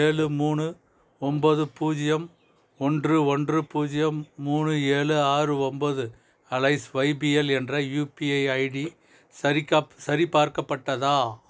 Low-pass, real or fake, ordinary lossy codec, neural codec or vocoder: none; real; none; none